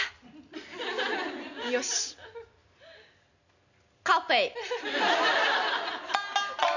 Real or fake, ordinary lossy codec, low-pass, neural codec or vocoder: real; none; 7.2 kHz; none